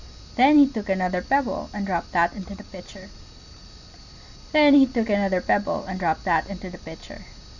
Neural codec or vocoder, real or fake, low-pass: none; real; 7.2 kHz